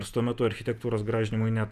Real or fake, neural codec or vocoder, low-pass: fake; vocoder, 48 kHz, 128 mel bands, Vocos; 14.4 kHz